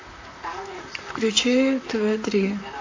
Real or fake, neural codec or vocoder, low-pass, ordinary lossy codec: fake; vocoder, 44.1 kHz, 128 mel bands, Pupu-Vocoder; 7.2 kHz; AAC, 48 kbps